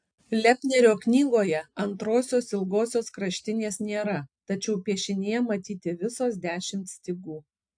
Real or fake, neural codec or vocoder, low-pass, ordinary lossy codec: real; none; 9.9 kHz; AAC, 64 kbps